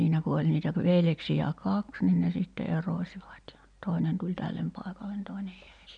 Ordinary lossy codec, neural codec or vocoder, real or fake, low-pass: none; none; real; 9.9 kHz